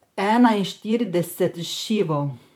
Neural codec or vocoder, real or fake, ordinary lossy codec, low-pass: vocoder, 44.1 kHz, 128 mel bands, Pupu-Vocoder; fake; MP3, 96 kbps; 19.8 kHz